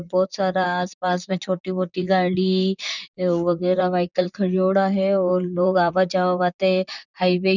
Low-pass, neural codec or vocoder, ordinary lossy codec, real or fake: 7.2 kHz; vocoder, 44.1 kHz, 128 mel bands, Pupu-Vocoder; none; fake